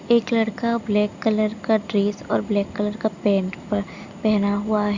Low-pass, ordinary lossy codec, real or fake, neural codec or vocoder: 7.2 kHz; Opus, 64 kbps; real; none